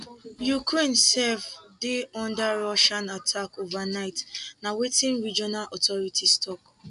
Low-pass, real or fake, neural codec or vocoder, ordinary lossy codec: 10.8 kHz; real; none; none